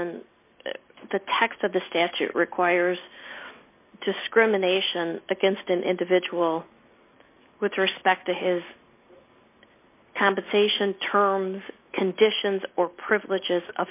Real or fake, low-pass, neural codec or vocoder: real; 3.6 kHz; none